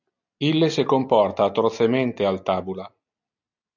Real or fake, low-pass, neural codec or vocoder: real; 7.2 kHz; none